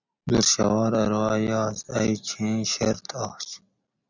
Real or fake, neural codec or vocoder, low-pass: real; none; 7.2 kHz